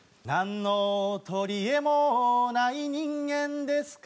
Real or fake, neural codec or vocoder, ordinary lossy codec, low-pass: real; none; none; none